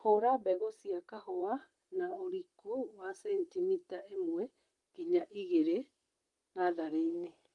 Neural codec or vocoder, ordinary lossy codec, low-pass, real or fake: vocoder, 44.1 kHz, 128 mel bands, Pupu-Vocoder; Opus, 32 kbps; 10.8 kHz; fake